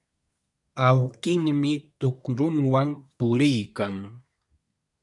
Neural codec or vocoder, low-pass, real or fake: codec, 24 kHz, 1 kbps, SNAC; 10.8 kHz; fake